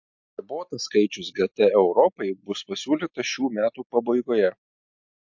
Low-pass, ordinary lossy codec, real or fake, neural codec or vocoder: 7.2 kHz; MP3, 48 kbps; real; none